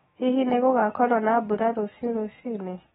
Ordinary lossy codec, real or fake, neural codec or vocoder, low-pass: AAC, 16 kbps; fake; autoencoder, 48 kHz, 128 numbers a frame, DAC-VAE, trained on Japanese speech; 19.8 kHz